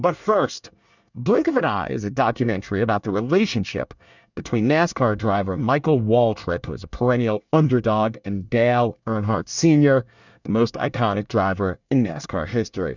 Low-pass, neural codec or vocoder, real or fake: 7.2 kHz; codec, 24 kHz, 1 kbps, SNAC; fake